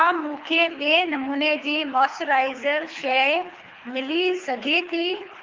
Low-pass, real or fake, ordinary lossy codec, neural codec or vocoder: 7.2 kHz; fake; Opus, 24 kbps; codec, 24 kHz, 3 kbps, HILCodec